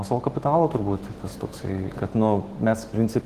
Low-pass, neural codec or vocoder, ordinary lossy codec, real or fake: 14.4 kHz; autoencoder, 48 kHz, 128 numbers a frame, DAC-VAE, trained on Japanese speech; Opus, 16 kbps; fake